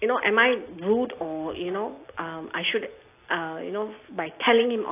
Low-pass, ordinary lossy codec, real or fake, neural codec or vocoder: 3.6 kHz; AAC, 24 kbps; real; none